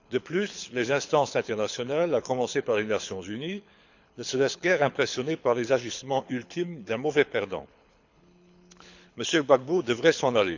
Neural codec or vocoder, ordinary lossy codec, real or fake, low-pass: codec, 24 kHz, 6 kbps, HILCodec; none; fake; 7.2 kHz